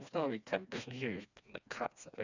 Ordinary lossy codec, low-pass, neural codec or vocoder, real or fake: none; 7.2 kHz; codec, 16 kHz, 2 kbps, FreqCodec, smaller model; fake